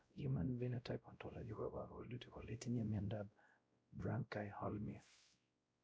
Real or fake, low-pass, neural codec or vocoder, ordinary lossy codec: fake; none; codec, 16 kHz, 0.5 kbps, X-Codec, WavLM features, trained on Multilingual LibriSpeech; none